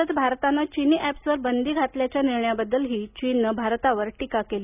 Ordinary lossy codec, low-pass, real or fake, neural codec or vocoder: none; 3.6 kHz; real; none